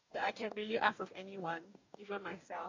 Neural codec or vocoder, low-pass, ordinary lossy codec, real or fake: codec, 44.1 kHz, 2.6 kbps, DAC; 7.2 kHz; MP3, 64 kbps; fake